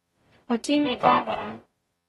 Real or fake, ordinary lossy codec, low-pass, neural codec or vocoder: fake; AAC, 32 kbps; 19.8 kHz; codec, 44.1 kHz, 0.9 kbps, DAC